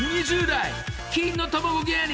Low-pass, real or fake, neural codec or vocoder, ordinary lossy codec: none; real; none; none